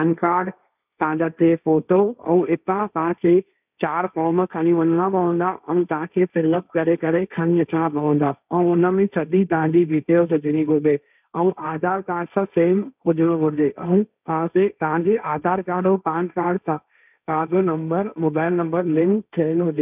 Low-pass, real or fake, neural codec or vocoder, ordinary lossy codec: 3.6 kHz; fake; codec, 16 kHz, 1.1 kbps, Voila-Tokenizer; none